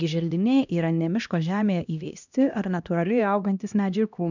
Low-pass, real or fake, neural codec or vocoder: 7.2 kHz; fake; codec, 16 kHz, 1 kbps, X-Codec, HuBERT features, trained on LibriSpeech